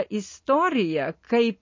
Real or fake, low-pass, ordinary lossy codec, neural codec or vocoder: real; 7.2 kHz; MP3, 32 kbps; none